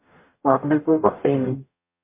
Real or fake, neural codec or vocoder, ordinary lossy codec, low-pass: fake; codec, 44.1 kHz, 0.9 kbps, DAC; MP3, 24 kbps; 3.6 kHz